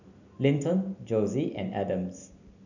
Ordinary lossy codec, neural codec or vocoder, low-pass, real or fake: none; none; 7.2 kHz; real